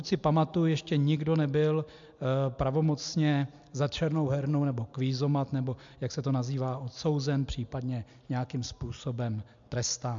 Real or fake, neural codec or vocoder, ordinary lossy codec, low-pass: real; none; MP3, 64 kbps; 7.2 kHz